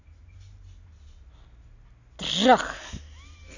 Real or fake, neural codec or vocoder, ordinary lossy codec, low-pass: real; none; none; 7.2 kHz